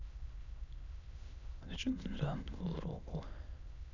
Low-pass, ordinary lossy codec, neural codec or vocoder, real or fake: 7.2 kHz; none; autoencoder, 22.05 kHz, a latent of 192 numbers a frame, VITS, trained on many speakers; fake